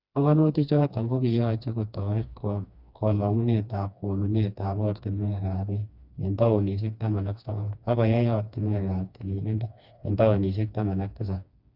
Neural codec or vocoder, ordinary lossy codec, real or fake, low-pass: codec, 16 kHz, 2 kbps, FreqCodec, smaller model; Opus, 64 kbps; fake; 5.4 kHz